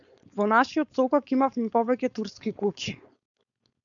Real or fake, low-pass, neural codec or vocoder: fake; 7.2 kHz; codec, 16 kHz, 4.8 kbps, FACodec